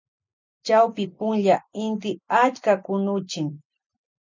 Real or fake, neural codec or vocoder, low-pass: real; none; 7.2 kHz